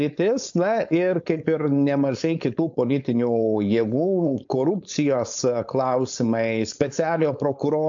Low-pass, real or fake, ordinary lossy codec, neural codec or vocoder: 7.2 kHz; fake; AAC, 64 kbps; codec, 16 kHz, 4.8 kbps, FACodec